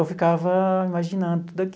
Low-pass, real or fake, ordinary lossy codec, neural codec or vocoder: none; real; none; none